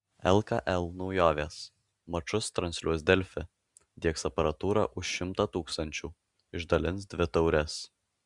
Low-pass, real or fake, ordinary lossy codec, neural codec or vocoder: 10.8 kHz; real; Opus, 64 kbps; none